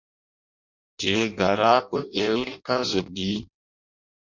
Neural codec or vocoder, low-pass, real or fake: codec, 16 kHz in and 24 kHz out, 0.6 kbps, FireRedTTS-2 codec; 7.2 kHz; fake